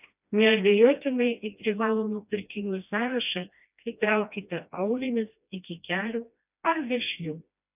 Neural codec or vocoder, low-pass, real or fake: codec, 16 kHz, 1 kbps, FreqCodec, smaller model; 3.6 kHz; fake